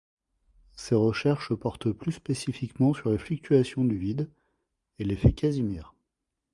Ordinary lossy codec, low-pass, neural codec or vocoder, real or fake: Opus, 64 kbps; 10.8 kHz; vocoder, 44.1 kHz, 128 mel bands every 512 samples, BigVGAN v2; fake